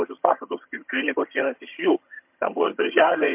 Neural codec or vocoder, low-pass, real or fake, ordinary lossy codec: vocoder, 22.05 kHz, 80 mel bands, HiFi-GAN; 3.6 kHz; fake; MP3, 32 kbps